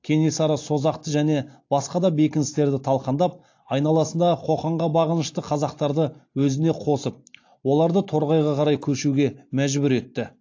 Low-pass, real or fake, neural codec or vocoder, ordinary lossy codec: 7.2 kHz; real; none; AAC, 48 kbps